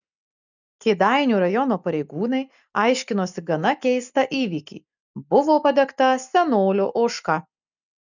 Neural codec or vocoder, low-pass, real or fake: none; 7.2 kHz; real